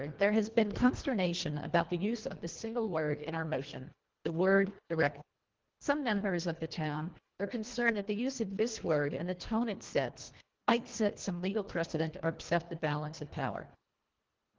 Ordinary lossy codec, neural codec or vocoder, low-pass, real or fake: Opus, 16 kbps; codec, 24 kHz, 1.5 kbps, HILCodec; 7.2 kHz; fake